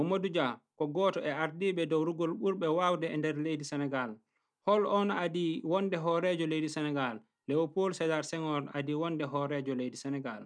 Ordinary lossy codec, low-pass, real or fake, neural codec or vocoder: none; 9.9 kHz; real; none